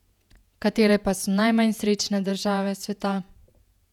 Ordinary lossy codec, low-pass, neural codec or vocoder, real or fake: none; 19.8 kHz; vocoder, 48 kHz, 128 mel bands, Vocos; fake